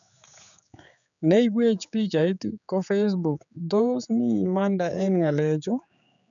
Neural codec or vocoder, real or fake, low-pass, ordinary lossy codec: codec, 16 kHz, 4 kbps, X-Codec, HuBERT features, trained on general audio; fake; 7.2 kHz; none